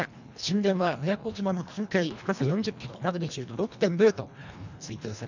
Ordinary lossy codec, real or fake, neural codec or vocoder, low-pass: none; fake; codec, 24 kHz, 1.5 kbps, HILCodec; 7.2 kHz